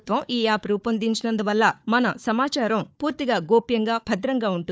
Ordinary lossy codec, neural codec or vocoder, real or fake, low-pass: none; codec, 16 kHz, 4 kbps, FunCodec, trained on Chinese and English, 50 frames a second; fake; none